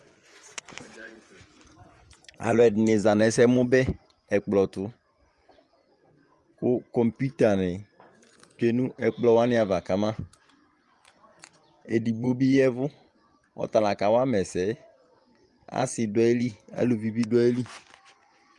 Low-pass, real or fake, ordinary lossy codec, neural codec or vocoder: 10.8 kHz; fake; Opus, 32 kbps; vocoder, 24 kHz, 100 mel bands, Vocos